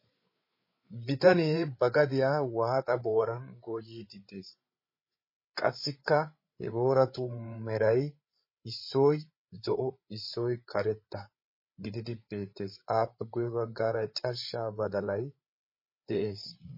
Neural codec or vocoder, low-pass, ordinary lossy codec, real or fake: codec, 16 kHz, 8 kbps, FreqCodec, larger model; 5.4 kHz; MP3, 24 kbps; fake